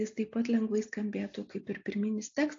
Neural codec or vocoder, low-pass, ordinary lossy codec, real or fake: none; 7.2 kHz; AAC, 48 kbps; real